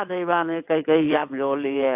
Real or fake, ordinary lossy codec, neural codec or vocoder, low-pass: fake; AAC, 32 kbps; vocoder, 22.05 kHz, 80 mel bands, WaveNeXt; 3.6 kHz